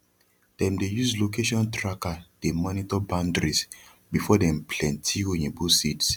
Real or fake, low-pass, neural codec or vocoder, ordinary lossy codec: real; 19.8 kHz; none; none